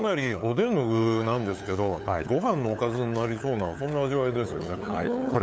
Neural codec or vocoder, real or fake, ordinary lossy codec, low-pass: codec, 16 kHz, 8 kbps, FunCodec, trained on LibriTTS, 25 frames a second; fake; none; none